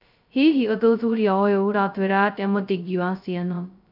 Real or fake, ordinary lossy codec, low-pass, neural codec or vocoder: fake; none; 5.4 kHz; codec, 16 kHz, 0.2 kbps, FocalCodec